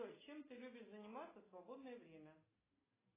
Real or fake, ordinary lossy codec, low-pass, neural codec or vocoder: real; AAC, 16 kbps; 3.6 kHz; none